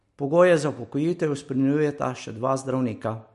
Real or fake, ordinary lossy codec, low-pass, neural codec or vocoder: real; MP3, 48 kbps; 14.4 kHz; none